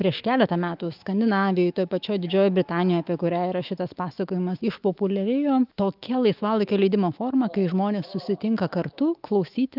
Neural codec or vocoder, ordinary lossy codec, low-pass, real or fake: autoencoder, 48 kHz, 128 numbers a frame, DAC-VAE, trained on Japanese speech; Opus, 24 kbps; 5.4 kHz; fake